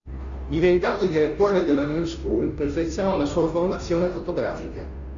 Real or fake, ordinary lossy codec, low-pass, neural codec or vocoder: fake; Opus, 64 kbps; 7.2 kHz; codec, 16 kHz, 0.5 kbps, FunCodec, trained on Chinese and English, 25 frames a second